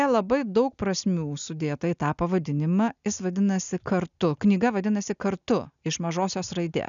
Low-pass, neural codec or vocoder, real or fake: 7.2 kHz; none; real